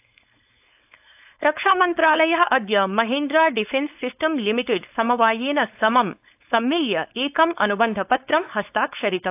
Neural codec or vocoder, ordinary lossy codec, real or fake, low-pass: codec, 16 kHz, 4.8 kbps, FACodec; AAC, 32 kbps; fake; 3.6 kHz